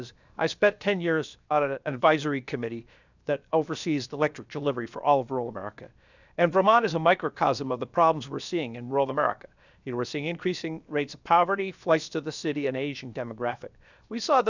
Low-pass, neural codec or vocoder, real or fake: 7.2 kHz; codec, 16 kHz, 0.7 kbps, FocalCodec; fake